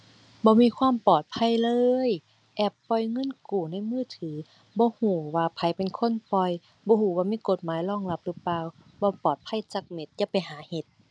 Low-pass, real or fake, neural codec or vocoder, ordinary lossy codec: 9.9 kHz; real; none; none